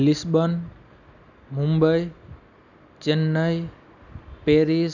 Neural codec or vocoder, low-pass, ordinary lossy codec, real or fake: none; 7.2 kHz; none; real